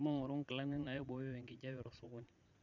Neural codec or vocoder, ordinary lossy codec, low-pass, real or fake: vocoder, 22.05 kHz, 80 mel bands, Vocos; none; 7.2 kHz; fake